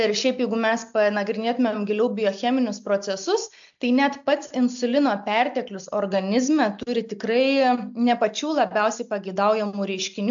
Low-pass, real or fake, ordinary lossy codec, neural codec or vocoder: 7.2 kHz; real; AAC, 64 kbps; none